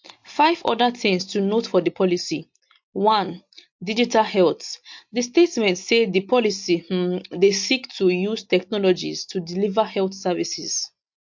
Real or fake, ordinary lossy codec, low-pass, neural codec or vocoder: real; MP3, 48 kbps; 7.2 kHz; none